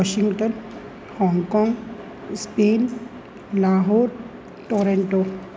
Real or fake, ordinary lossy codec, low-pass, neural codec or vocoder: real; none; none; none